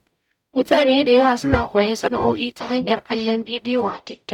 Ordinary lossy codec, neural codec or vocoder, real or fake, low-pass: none; codec, 44.1 kHz, 0.9 kbps, DAC; fake; 19.8 kHz